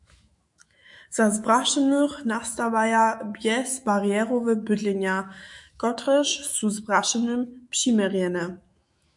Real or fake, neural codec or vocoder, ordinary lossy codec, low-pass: fake; autoencoder, 48 kHz, 128 numbers a frame, DAC-VAE, trained on Japanese speech; MP3, 64 kbps; 10.8 kHz